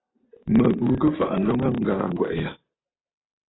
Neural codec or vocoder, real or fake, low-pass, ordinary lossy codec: none; real; 7.2 kHz; AAC, 16 kbps